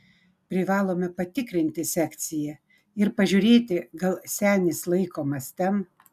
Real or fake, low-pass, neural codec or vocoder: real; 14.4 kHz; none